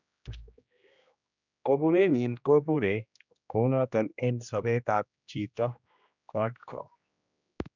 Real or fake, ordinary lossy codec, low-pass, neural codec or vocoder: fake; none; 7.2 kHz; codec, 16 kHz, 1 kbps, X-Codec, HuBERT features, trained on general audio